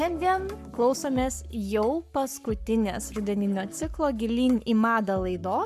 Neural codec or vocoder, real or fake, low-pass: codec, 44.1 kHz, 7.8 kbps, Pupu-Codec; fake; 14.4 kHz